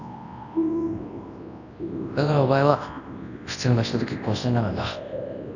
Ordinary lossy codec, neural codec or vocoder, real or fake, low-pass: none; codec, 24 kHz, 0.9 kbps, WavTokenizer, large speech release; fake; 7.2 kHz